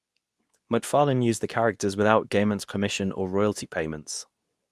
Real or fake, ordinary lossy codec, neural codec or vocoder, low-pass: fake; none; codec, 24 kHz, 0.9 kbps, WavTokenizer, medium speech release version 2; none